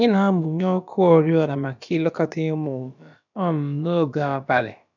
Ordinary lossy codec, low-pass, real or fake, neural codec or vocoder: none; 7.2 kHz; fake; codec, 16 kHz, about 1 kbps, DyCAST, with the encoder's durations